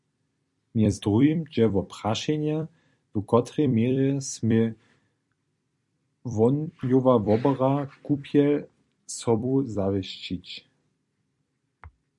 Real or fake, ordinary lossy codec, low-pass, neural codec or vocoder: fake; MP3, 96 kbps; 10.8 kHz; vocoder, 44.1 kHz, 128 mel bands every 256 samples, BigVGAN v2